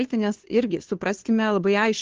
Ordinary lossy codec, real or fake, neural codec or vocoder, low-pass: Opus, 16 kbps; fake; codec, 16 kHz, 2 kbps, FunCodec, trained on Chinese and English, 25 frames a second; 7.2 kHz